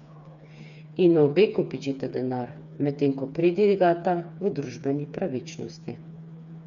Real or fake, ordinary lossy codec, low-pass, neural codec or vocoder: fake; none; 7.2 kHz; codec, 16 kHz, 4 kbps, FreqCodec, smaller model